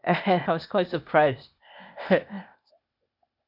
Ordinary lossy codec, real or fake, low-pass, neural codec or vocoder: none; fake; 5.4 kHz; codec, 16 kHz, 0.8 kbps, ZipCodec